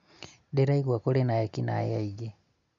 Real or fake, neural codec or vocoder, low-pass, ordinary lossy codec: real; none; 7.2 kHz; none